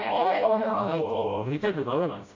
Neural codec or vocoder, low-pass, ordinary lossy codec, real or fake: codec, 16 kHz, 0.5 kbps, FreqCodec, smaller model; 7.2 kHz; AAC, 48 kbps; fake